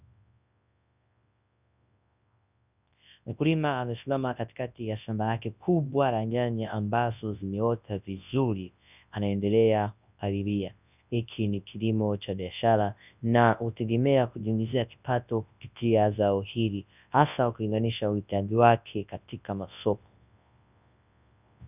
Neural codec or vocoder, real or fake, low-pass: codec, 24 kHz, 0.9 kbps, WavTokenizer, large speech release; fake; 3.6 kHz